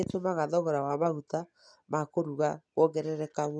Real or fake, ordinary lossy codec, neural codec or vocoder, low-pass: real; none; none; 9.9 kHz